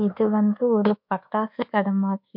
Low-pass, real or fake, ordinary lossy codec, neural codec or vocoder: 5.4 kHz; fake; MP3, 48 kbps; codec, 24 kHz, 1.2 kbps, DualCodec